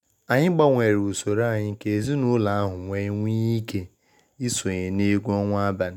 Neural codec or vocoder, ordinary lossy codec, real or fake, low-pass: none; none; real; 19.8 kHz